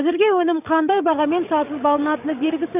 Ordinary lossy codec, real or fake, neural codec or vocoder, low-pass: none; fake; codec, 16 kHz, 16 kbps, FreqCodec, larger model; 3.6 kHz